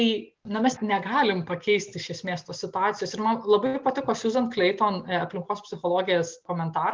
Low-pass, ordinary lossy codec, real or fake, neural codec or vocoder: 7.2 kHz; Opus, 32 kbps; real; none